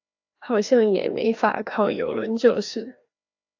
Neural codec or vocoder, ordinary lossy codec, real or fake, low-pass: codec, 16 kHz, 1 kbps, FreqCodec, larger model; AAC, 48 kbps; fake; 7.2 kHz